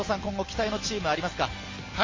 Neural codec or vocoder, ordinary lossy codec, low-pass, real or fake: none; MP3, 32 kbps; 7.2 kHz; real